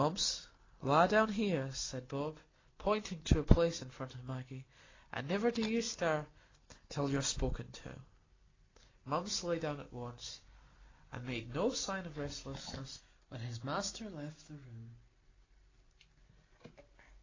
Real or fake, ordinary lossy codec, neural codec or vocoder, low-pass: real; AAC, 32 kbps; none; 7.2 kHz